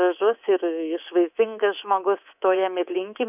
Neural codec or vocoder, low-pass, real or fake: codec, 24 kHz, 3.1 kbps, DualCodec; 3.6 kHz; fake